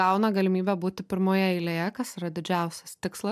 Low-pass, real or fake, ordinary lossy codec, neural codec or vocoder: 14.4 kHz; real; MP3, 96 kbps; none